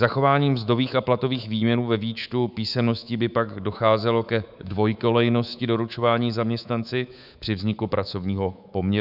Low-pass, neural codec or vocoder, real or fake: 5.4 kHz; codec, 24 kHz, 3.1 kbps, DualCodec; fake